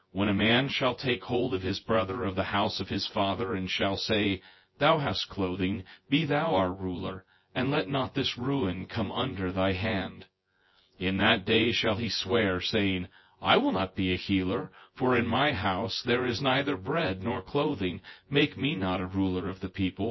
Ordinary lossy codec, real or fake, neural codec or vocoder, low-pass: MP3, 24 kbps; fake; vocoder, 24 kHz, 100 mel bands, Vocos; 7.2 kHz